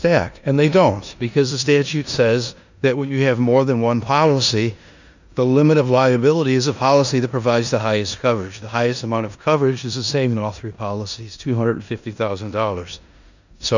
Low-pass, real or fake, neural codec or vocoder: 7.2 kHz; fake; codec, 16 kHz in and 24 kHz out, 0.9 kbps, LongCat-Audio-Codec, four codebook decoder